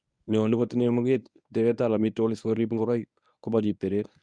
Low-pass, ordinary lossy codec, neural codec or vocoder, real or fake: 9.9 kHz; none; codec, 24 kHz, 0.9 kbps, WavTokenizer, medium speech release version 1; fake